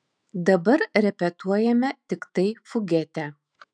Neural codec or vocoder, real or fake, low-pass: autoencoder, 48 kHz, 128 numbers a frame, DAC-VAE, trained on Japanese speech; fake; 9.9 kHz